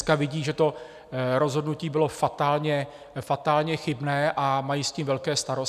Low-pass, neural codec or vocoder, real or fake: 14.4 kHz; none; real